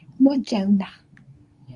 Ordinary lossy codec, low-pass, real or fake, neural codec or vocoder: Opus, 64 kbps; 10.8 kHz; fake; codec, 24 kHz, 0.9 kbps, WavTokenizer, medium speech release version 2